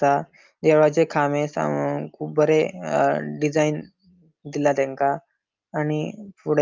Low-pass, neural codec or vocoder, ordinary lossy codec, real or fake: 7.2 kHz; none; Opus, 24 kbps; real